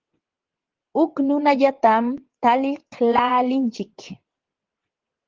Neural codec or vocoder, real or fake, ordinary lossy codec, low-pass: vocoder, 22.05 kHz, 80 mel bands, WaveNeXt; fake; Opus, 16 kbps; 7.2 kHz